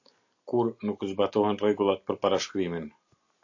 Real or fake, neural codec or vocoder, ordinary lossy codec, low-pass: real; none; AAC, 48 kbps; 7.2 kHz